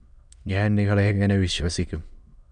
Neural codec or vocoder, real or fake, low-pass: autoencoder, 22.05 kHz, a latent of 192 numbers a frame, VITS, trained on many speakers; fake; 9.9 kHz